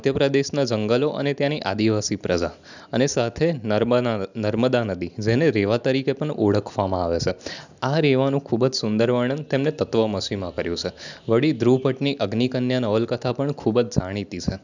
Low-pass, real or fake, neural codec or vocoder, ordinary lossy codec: 7.2 kHz; real; none; none